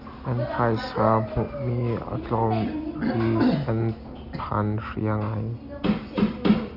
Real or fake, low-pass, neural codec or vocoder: real; 5.4 kHz; none